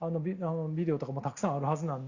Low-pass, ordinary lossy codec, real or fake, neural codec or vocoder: 7.2 kHz; Opus, 64 kbps; real; none